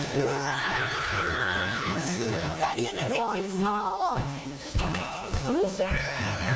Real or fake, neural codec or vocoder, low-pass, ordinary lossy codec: fake; codec, 16 kHz, 1 kbps, FunCodec, trained on LibriTTS, 50 frames a second; none; none